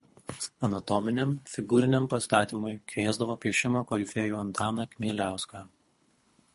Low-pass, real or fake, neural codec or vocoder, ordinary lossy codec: 10.8 kHz; fake; codec, 24 kHz, 3 kbps, HILCodec; MP3, 48 kbps